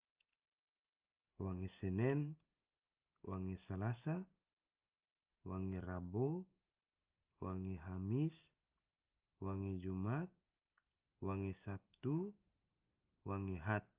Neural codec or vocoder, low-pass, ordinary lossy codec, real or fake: none; 3.6 kHz; Opus, 24 kbps; real